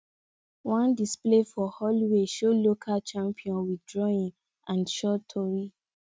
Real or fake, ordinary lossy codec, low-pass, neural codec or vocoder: real; none; none; none